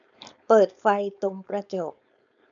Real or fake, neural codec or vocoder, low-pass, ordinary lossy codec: fake; codec, 16 kHz, 4.8 kbps, FACodec; 7.2 kHz; none